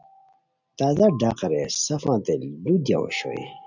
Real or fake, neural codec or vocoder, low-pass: real; none; 7.2 kHz